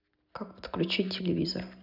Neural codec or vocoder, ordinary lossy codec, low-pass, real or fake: none; none; 5.4 kHz; real